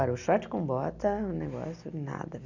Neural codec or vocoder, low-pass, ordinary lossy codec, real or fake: none; 7.2 kHz; none; real